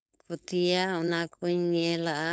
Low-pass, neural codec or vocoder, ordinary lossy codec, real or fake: none; codec, 16 kHz, 4.8 kbps, FACodec; none; fake